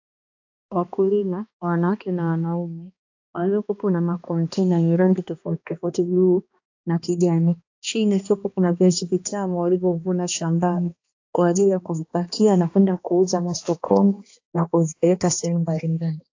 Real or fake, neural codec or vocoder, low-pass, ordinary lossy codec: fake; codec, 16 kHz, 2 kbps, X-Codec, HuBERT features, trained on balanced general audio; 7.2 kHz; AAC, 48 kbps